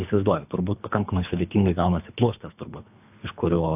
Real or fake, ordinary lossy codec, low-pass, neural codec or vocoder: fake; AAC, 32 kbps; 3.6 kHz; codec, 24 kHz, 3 kbps, HILCodec